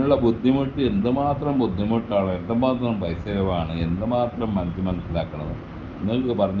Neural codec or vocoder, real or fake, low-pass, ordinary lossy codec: none; real; 7.2 kHz; Opus, 32 kbps